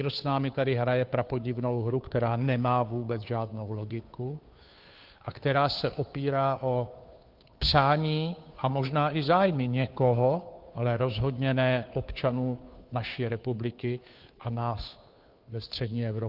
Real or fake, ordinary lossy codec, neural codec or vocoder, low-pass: fake; Opus, 24 kbps; codec, 16 kHz, 2 kbps, FunCodec, trained on Chinese and English, 25 frames a second; 5.4 kHz